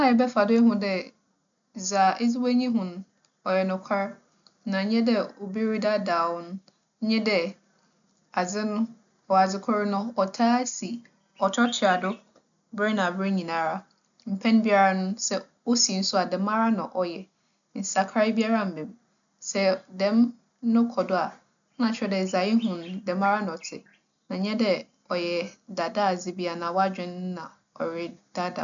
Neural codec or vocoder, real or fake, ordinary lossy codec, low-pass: none; real; MP3, 96 kbps; 7.2 kHz